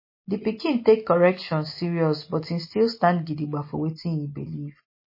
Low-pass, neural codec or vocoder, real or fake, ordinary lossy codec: 5.4 kHz; none; real; MP3, 24 kbps